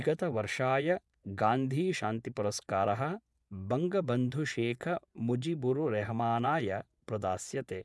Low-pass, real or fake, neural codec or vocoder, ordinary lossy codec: none; fake; vocoder, 24 kHz, 100 mel bands, Vocos; none